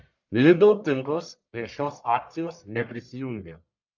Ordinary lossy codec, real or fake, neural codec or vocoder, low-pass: MP3, 64 kbps; fake; codec, 44.1 kHz, 1.7 kbps, Pupu-Codec; 7.2 kHz